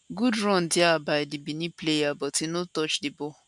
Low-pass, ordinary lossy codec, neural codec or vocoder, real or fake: 10.8 kHz; none; none; real